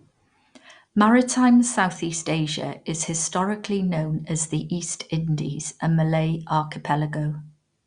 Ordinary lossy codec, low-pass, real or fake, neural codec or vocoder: Opus, 64 kbps; 9.9 kHz; real; none